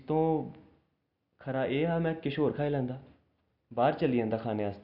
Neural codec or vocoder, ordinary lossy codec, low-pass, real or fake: none; none; 5.4 kHz; real